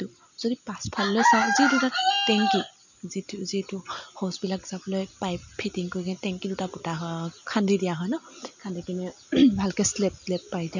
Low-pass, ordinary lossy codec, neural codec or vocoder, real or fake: 7.2 kHz; none; none; real